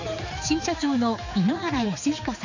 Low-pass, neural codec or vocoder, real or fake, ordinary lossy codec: 7.2 kHz; codec, 16 kHz, 4 kbps, X-Codec, HuBERT features, trained on general audio; fake; none